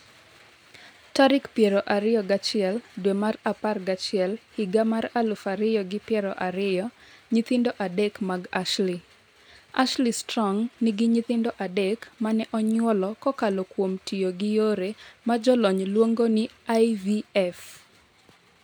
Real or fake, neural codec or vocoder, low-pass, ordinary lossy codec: real; none; none; none